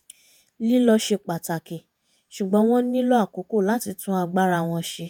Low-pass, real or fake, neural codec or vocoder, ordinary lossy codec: none; fake; vocoder, 48 kHz, 128 mel bands, Vocos; none